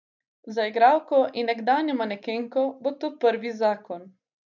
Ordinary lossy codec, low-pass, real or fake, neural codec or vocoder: none; 7.2 kHz; real; none